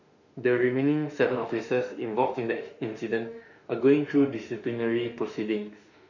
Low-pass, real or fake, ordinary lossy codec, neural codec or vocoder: 7.2 kHz; fake; none; autoencoder, 48 kHz, 32 numbers a frame, DAC-VAE, trained on Japanese speech